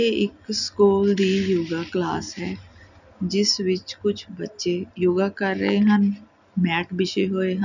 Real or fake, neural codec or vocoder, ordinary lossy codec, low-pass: real; none; none; 7.2 kHz